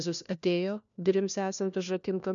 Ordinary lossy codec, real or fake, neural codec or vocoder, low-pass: MP3, 96 kbps; fake; codec, 16 kHz, 1 kbps, FunCodec, trained on LibriTTS, 50 frames a second; 7.2 kHz